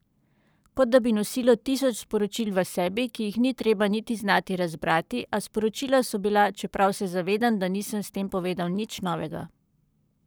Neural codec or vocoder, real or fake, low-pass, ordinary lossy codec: codec, 44.1 kHz, 7.8 kbps, Pupu-Codec; fake; none; none